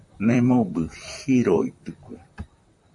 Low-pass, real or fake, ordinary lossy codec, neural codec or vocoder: 10.8 kHz; fake; MP3, 48 kbps; vocoder, 44.1 kHz, 128 mel bands, Pupu-Vocoder